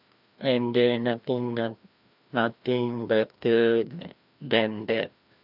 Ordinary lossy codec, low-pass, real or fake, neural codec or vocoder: AAC, 48 kbps; 5.4 kHz; fake; codec, 16 kHz, 1 kbps, FreqCodec, larger model